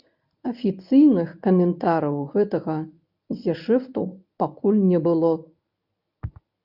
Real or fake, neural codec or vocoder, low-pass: fake; codec, 24 kHz, 0.9 kbps, WavTokenizer, medium speech release version 1; 5.4 kHz